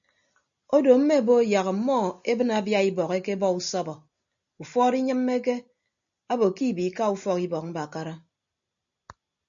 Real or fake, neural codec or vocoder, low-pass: real; none; 7.2 kHz